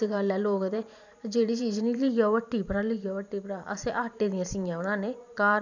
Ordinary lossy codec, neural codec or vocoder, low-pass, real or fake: none; vocoder, 44.1 kHz, 128 mel bands every 512 samples, BigVGAN v2; 7.2 kHz; fake